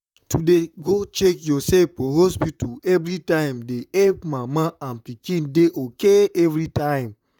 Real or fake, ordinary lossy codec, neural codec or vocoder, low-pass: fake; none; vocoder, 44.1 kHz, 128 mel bands, Pupu-Vocoder; 19.8 kHz